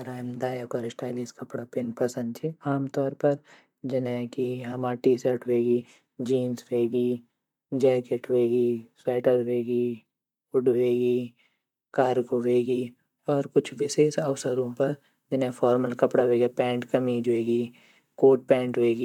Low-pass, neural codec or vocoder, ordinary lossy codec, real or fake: 19.8 kHz; vocoder, 44.1 kHz, 128 mel bands, Pupu-Vocoder; MP3, 96 kbps; fake